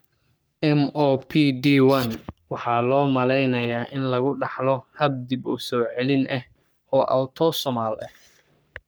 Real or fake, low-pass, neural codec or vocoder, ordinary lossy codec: fake; none; codec, 44.1 kHz, 3.4 kbps, Pupu-Codec; none